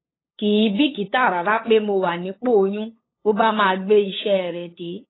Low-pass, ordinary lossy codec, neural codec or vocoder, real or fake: 7.2 kHz; AAC, 16 kbps; codec, 16 kHz, 8 kbps, FunCodec, trained on LibriTTS, 25 frames a second; fake